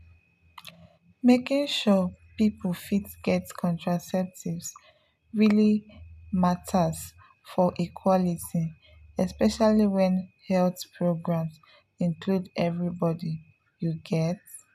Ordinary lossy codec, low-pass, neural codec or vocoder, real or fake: none; 14.4 kHz; none; real